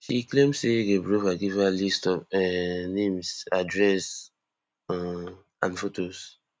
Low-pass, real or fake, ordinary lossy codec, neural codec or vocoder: none; real; none; none